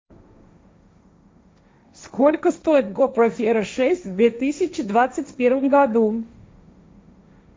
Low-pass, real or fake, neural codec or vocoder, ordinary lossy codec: none; fake; codec, 16 kHz, 1.1 kbps, Voila-Tokenizer; none